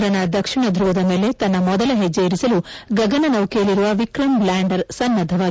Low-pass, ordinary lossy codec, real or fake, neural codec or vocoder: none; none; real; none